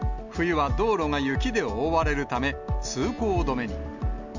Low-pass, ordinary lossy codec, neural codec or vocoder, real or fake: 7.2 kHz; none; none; real